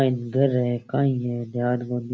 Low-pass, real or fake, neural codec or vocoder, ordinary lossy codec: none; real; none; none